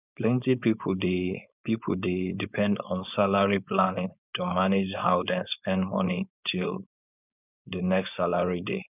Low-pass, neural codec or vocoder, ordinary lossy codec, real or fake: 3.6 kHz; codec, 16 kHz, 4.8 kbps, FACodec; none; fake